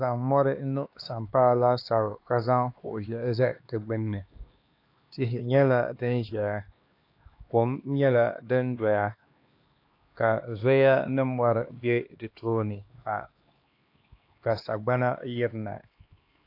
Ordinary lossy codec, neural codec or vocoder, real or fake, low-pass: AAC, 32 kbps; codec, 16 kHz, 2 kbps, X-Codec, HuBERT features, trained on LibriSpeech; fake; 5.4 kHz